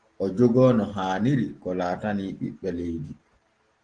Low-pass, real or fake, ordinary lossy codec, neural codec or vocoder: 9.9 kHz; real; Opus, 16 kbps; none